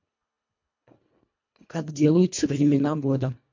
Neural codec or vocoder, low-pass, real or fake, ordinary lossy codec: codec, 24 kHz, 1.5 kbps, HILCodec; 7.2 kHz; fake; MP3, 48 kbps